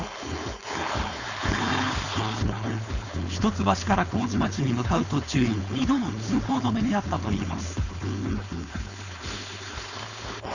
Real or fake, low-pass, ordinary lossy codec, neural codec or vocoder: fake; 7.2 kHz; none; codec, 16 kHz, 4.8 kbps, FACodec